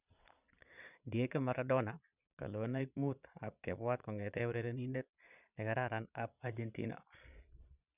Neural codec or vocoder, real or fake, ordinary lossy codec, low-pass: vocoder, 22.05 kHz, 80 mel bands, Vocos; fake; none; 3.6 kHz